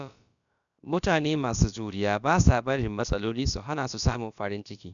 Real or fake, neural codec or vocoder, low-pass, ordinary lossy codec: fake; codec, 16 kHz, about 1 kbps, DyCAST, with the encoder's durations; 7.2 kHz; none